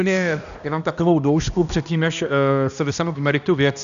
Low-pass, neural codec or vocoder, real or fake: 7.2 kHz; codec, 16 kHz, 1 kbps, X-Codec, HuBERT features, trained on balanced general audio; fake